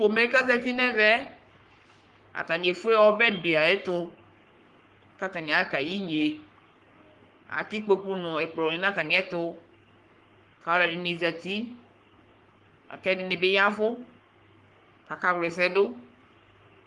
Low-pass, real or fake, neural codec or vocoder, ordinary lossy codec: 10.8 kHz; fake; codec, 44.1 kHz, 3.4 kbps, Pupu-Codec; Opus, 24 kbps